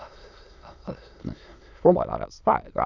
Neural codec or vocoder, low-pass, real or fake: autoencoder, 22.05 kHz, a latent of 192 numbers a frame, VITS, trained on many speakers; 7.2 kHz; fake